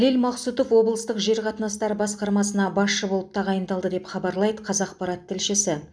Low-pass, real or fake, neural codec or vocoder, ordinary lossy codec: none; real; none; none